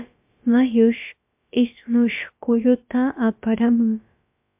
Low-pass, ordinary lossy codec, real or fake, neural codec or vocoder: 3.6 kHz; MP3, 32 kbps; fake; codec, 16 kHz, about 1 kbps, DyCAST, with the encoder's durations